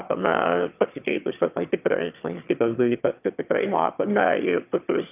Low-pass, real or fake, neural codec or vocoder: 3.6 kHz; fake; autoencoder, 22.05 kHz, a latent of 192 numbers a frame, VITS, trained on one speaker